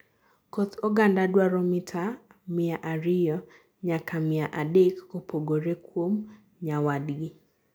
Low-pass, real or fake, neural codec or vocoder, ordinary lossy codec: none; real; none; none